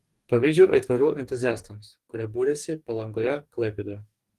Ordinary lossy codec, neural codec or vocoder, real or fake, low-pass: Opus, 24 kbps; codec, 44.1 kHz, 2.6 kbps, DAC; fake; 14.4 kHz